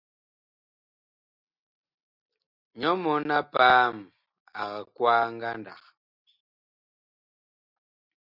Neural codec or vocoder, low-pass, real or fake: none; 5.4 kHz; real